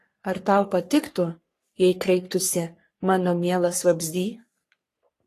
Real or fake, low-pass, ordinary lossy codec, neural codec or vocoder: fake; 14.4 kHz; AAC, 48 kbps; codec, 44.1 kHz, 3.4 kbps, Pupu-Codec